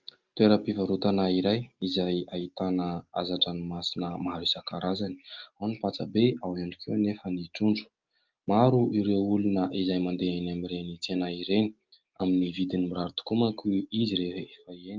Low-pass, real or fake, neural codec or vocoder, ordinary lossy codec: 7.2 kHz; real; none; Opus, 24 kbps